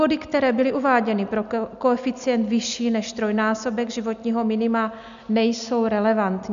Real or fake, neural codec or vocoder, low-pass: real; none; 7.2 kHz